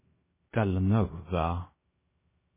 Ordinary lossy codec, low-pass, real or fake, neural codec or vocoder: MP3, 16 kbps; 3.6 kHz; fake; codec, 16 kHz, 0.3 kbps, FocalCodec